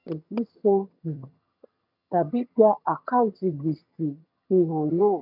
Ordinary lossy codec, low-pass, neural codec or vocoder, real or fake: none; 5.4 kHz; vocoder, 22.05 kHz, 80 mel bands, HiFi-GAN; fake